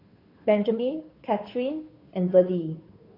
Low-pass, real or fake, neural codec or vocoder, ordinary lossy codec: 5.4 kHz; fake; codec, 16 kHz, 2 kbps, FunCodec, trained on Chinese and English, 25 frames a second; AAC, 24 kbps